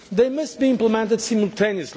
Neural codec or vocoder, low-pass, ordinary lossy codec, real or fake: none; none; none; real